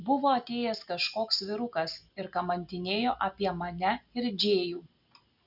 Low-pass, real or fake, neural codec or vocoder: 5.4 kHz; real; none